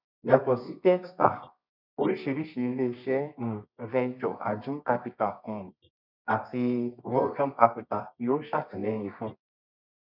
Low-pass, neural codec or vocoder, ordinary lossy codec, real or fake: 5.4 kHz; codec, 24 kHz, 0.9 kbps, WavTokenizer, medium music audio release; none; fake